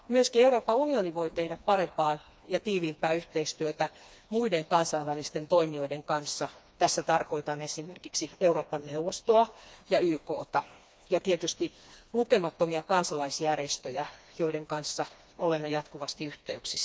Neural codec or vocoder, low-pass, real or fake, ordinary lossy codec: codec, 16 kHz, 2 kbps, FreqCodec, smaller model; none; fake; none